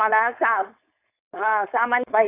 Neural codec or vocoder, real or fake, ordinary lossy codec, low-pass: codec, 16 kHz, 4.8 kbps, FACodec; fake; AAC, 24 kbps; 3.6 kHz